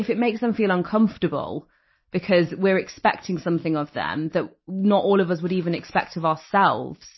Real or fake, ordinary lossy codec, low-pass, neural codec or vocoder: real; MP3, 24 kbps; 7.2 kHz; none